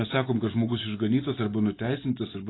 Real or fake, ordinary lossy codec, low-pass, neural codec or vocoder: real; AAC, 16 kbps; 7.2 kHz; none